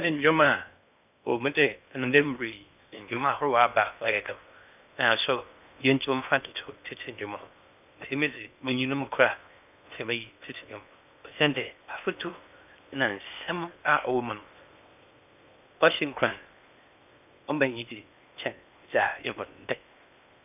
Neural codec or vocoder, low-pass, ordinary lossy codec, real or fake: codec, 16 kHz in and 24 kHz out, 0.8 kbps, FocalCodec, streaming, 65536 codes; 3.6 kHz; none; fake